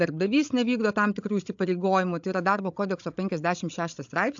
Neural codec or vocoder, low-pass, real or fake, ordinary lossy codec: codec, 16 kHz, 16 kbps, FreqCodec, larger model; 7.2 kHz; fake; AAC, 64 kbps